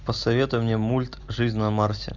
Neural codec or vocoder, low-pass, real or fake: none; 7.2 kHz; real